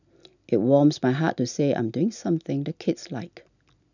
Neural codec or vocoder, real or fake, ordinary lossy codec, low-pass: none; real; none; 7.2 kHz